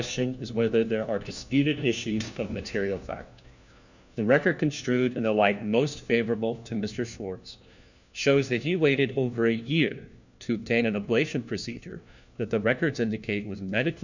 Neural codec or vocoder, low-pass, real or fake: codec, 16 kHz, 1 kbps, FunCodec, trained on LibriTTS, 50 frames a second; 7.2 kHz; fake